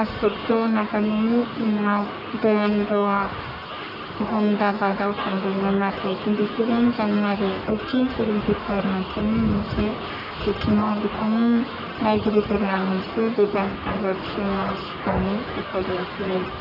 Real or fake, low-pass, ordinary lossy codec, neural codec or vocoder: fake; 5.4 kHz; none; codec, 44.1 kHz, 1.7 kbps, Pupu-Codec